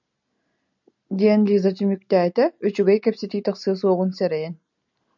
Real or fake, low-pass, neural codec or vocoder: real; 7.2 kHz; none